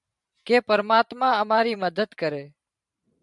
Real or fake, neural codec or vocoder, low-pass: fake; vocoder, 44.1 kHz, 128 mel bands every 512 samples, BigVGAN v2; 10.8 kHz